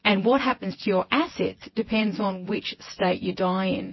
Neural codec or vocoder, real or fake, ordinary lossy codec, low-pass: vocoder, 24 kHz, 100 mel bands, Vocos; fake; MP3, 24 kbps; 7.2 kHz